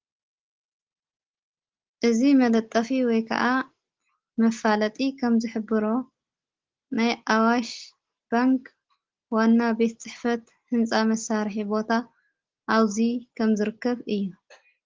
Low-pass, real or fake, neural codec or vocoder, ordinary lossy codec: 7.2 kHz; real; none; Opus, 16 kbps